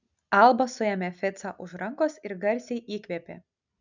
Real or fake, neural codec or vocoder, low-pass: real; none; 7.2 kHz